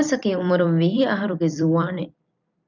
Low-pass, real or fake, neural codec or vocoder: 7.2 kHz; fake; vocoder, 44.1 kHz, 128 mel bands every 256 samples, BigVGAN v2